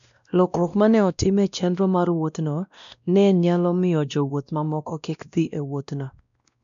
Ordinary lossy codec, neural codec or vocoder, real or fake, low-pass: none; codec, 16 kHz, 1 kbps, X-Codec, WavLM features, trained on Multilingual LibriSpeech; fake; 7.2 kHz